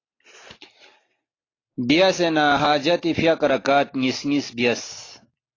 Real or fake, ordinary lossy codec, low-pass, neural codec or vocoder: real; AAC, 32 kbps; 7.2 kHz; none